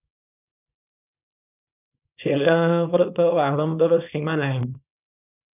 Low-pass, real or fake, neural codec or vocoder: 3.6 kHz; fake; codec, 24 kHz, 0.9 kbps, WavTokenizer, small release